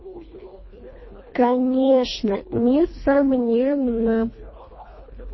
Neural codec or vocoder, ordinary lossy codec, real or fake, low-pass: codec, 24 kHz, 1.5 kbps, HILCodec; MP3, 24 kbps; fake; 7.2 kHz